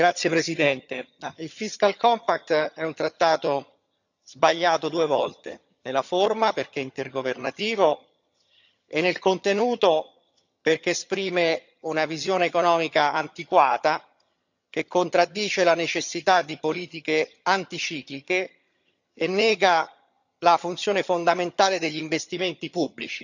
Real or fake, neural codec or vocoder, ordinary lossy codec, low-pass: fake; vocoder, 22.05 kHz, 80 mel bands, HiFi-GAN; none; 7.2 kHz